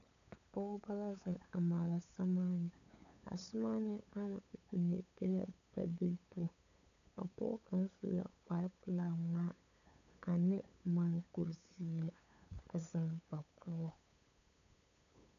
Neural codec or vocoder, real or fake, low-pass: codec, 16 kHz, 8 kbps, FunCodec, trained on LibriTTS, 25 frames a second; fake; 7.2 kHz